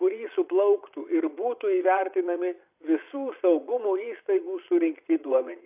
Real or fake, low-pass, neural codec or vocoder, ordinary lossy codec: fake; 3.6 kHz; vocoder, 22.05 kHz, 80 mel bands, Vocos; AAC, 32 kbps